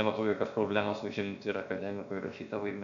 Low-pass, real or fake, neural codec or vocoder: 7.2 kHz; fake; codec, 16 kHz, about 1 kbps, DyCAST, with the encoder's durations